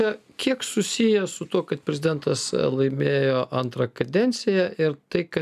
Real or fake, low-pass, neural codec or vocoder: real; 14.4 kHz; none